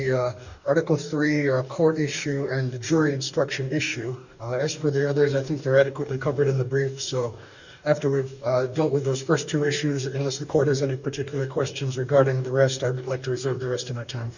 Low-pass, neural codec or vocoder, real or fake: 7.2 kHz; codec, 44.1 kHz, 2.6 kbps, DAC; fake